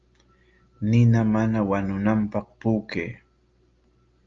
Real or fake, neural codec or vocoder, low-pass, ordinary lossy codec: real; none; 7.2 kHz; Opus, 32 kbps